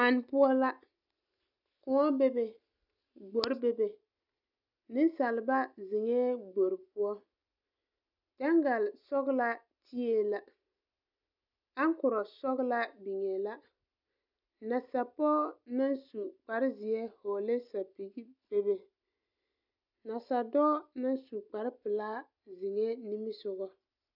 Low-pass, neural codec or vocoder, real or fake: 5.4 kHz; none; real